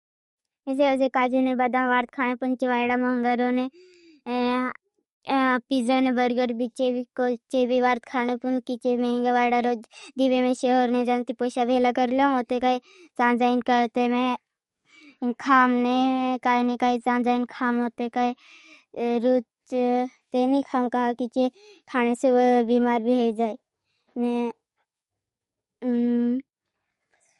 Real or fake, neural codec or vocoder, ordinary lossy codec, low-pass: fake; codec, 44.1 kHz, 7.8 kbps, DAC; MP3, 48 kbps; 19.8 kHz